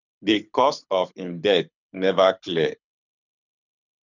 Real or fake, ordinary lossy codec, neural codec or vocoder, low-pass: fake; none; codec, 24 kHz, 6 kbps, HILCodec; 7.2 kHz